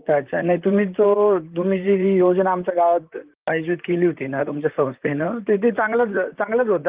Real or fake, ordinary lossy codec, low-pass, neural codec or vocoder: fake; Opus, 32 kbps; 3.6 kHz; vocoder, 44.1 kHz, 128 mel bands, Pupu-Vocoder